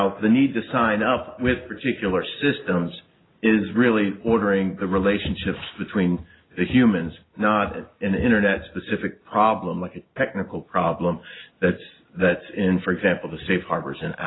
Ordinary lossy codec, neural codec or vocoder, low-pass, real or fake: AAC, 16 kbps; none; 7.2 kHz; real